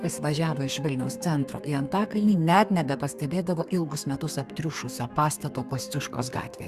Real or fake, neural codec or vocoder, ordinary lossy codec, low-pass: fake; codec, 32 kHz, 1.9 kbps, SNAC; Opus, 64 kbps; 14.4 kHz